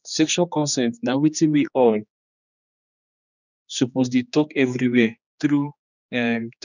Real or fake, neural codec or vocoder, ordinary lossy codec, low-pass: fake; codec, 16 kHz, 2 kbps, X-Codec, HuBERT features, trained on general audio; none; 7.2 kHz